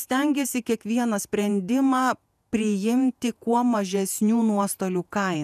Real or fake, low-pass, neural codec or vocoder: fake; 14.4 kHz; vocoder, 48 kHz, 128 mel bands, Vocos